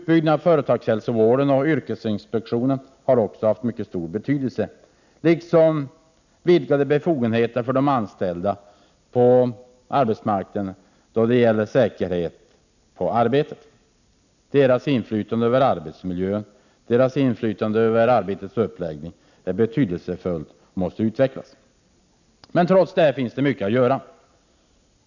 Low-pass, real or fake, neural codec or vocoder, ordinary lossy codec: 7.2 kHz; real; none; none